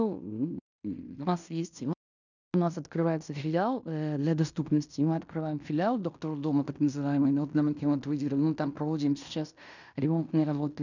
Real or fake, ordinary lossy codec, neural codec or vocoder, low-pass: fake; none; codec, 16 kHz in and 24 kHz out, 0.9 kbps, LongCat-Audio-Codec, fine tuned four codebook decoder; 7.2 kHz